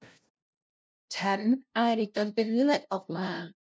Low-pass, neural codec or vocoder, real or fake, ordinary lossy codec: none; codec, 16 kHz, 0.5 kbps, FunCodec, trained on LibriTTS, 25 frames a second; fake; none